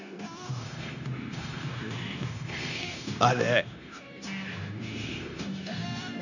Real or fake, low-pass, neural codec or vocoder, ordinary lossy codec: fake; 7.2 kHz; codec, 16 kHz, 0.9 kbps, LongCat-Audio-Codec; none